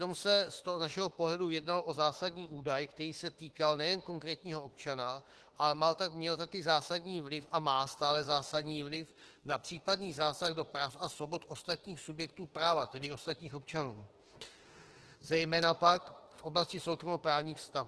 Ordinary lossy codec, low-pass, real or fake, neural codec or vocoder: Opus, 16 kbps; 10.8 kHz; fake; autoencoder, 48 kHz, 32 numbers a frame, DAC-VAE, trained on Japanese speech